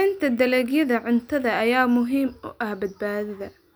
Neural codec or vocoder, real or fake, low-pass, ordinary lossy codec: none; real; none; none